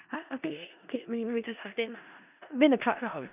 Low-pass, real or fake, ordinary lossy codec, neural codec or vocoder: 3.6 kHz; fake; none; codec, 16 kHz in and 24 kHz out, 0.4 kbps, LongCat-Audio-Codec, four codebook decoder